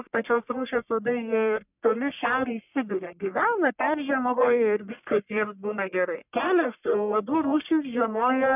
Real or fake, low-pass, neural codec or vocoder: fake; 3.6 kHz; codec, 44.1 kHz, 1.7 kbps, Pupu-Codec